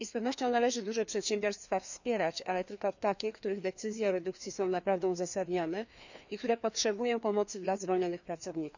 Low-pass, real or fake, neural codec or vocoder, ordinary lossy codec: 7.2 kHz; fake; codec, 16 kHz, 2 kbps, FreqCodec, larger model; none